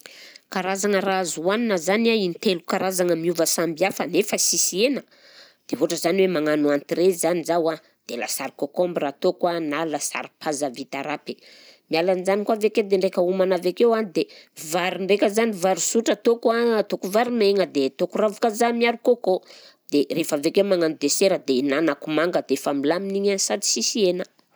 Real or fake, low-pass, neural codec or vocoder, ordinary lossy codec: real; none; none; none